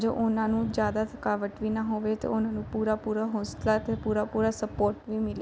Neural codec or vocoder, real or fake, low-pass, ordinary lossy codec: none; real; none; none